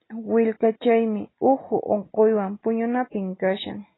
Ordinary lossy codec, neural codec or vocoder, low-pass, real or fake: AAC, 16 kbps; none; 7.2 kHz; real